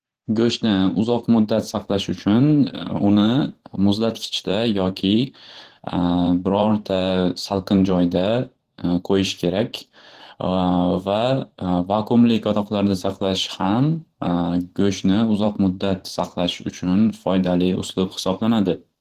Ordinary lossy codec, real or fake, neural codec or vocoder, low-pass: Opus, 16 kbps; fake; vocoder, 44.1 kHz, 128 mel bands every 512 samples, BigVGAN v2; 14.4 kHz